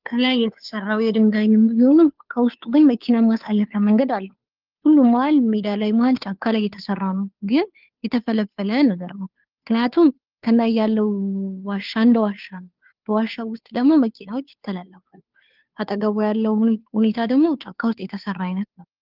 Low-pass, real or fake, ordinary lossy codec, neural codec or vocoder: 5.4 kHz; fake; Opus, 32 kbps; codec, 16 kHz, 2 kbps, FunCodec, trained on Chinese and English, 25 frames a second